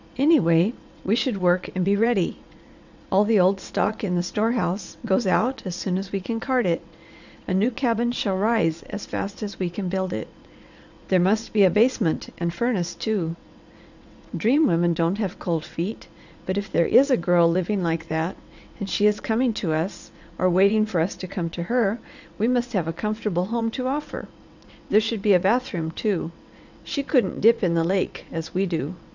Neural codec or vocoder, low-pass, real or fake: vocoder, 22.05 kHz, 80 mel bands, WaveNeXt; 7.2 kHz; fake